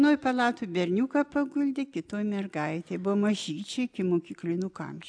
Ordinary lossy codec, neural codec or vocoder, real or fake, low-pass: AAC, 64 kbps; none; real; 9.9 kHz